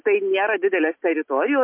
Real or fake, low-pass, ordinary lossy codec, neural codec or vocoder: real; 3.6 kHz; MP3, 32 kbps; none